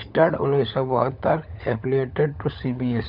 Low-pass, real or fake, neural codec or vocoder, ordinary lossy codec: 5.4 kHz; fake; codec, 16 kHz, 16 kbps, FunCodec, trained on LibriTTS, 50 frames a second; none